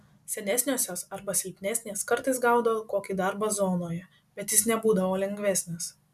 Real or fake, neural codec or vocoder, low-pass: real; none; 14.4 kHz